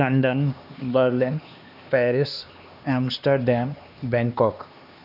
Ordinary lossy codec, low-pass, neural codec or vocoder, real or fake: none; 5.4 kHz; codec, 16 kHz, 2 kbps, X-Codec, HuBERT features, trained on LibriSpeech; fake